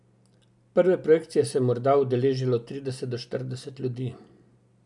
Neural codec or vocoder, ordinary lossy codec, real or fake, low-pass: none; none; real; 10.8 kHz